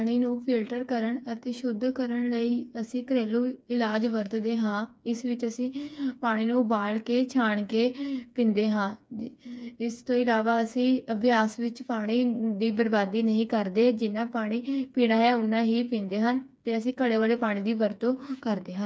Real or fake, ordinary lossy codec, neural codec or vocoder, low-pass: fake; none; codec, 16 kHz, 4 kbps, FreqCodec, smaller model; none